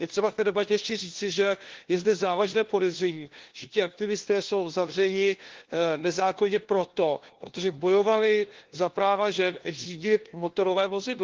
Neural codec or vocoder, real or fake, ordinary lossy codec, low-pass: codec, 16 kHz, 1 kbps, FunCodec, trained on LibriTTS, 50 frames a second; fake; Opus, 16 kbps; 7.2 kHz